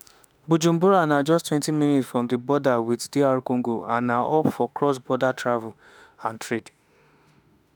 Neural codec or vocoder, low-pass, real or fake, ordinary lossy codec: autoencoder, 48 kHz, 32 numbers a frame, DAC-VAE, trained on Japanese speech; none; fake; none